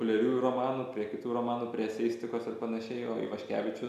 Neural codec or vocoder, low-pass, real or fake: none; 14.4 kHz; real